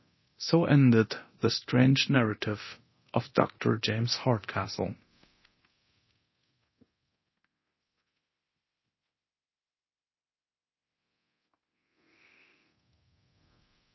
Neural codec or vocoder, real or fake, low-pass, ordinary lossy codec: codec, 24 kHz, 0.9 kbps, DualCodec; fake; 7.2 kHz; MP3, 24 kbps